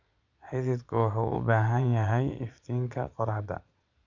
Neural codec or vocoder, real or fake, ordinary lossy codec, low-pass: vocoder, 22.05 kHz, 80 mel bands, Vocos; fake; none; 7.2 kHz